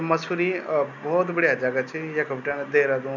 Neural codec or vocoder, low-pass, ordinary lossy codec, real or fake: none; 7.2 kHz; none; real